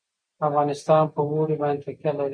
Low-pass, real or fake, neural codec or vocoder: 9.9 kHz; real; none